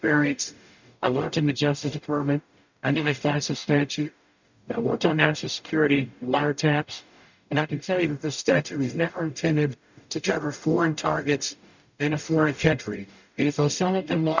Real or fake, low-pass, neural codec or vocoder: fake; 7.2 kHz; codec, 44.1 kHz, 0.9 kbps, DAC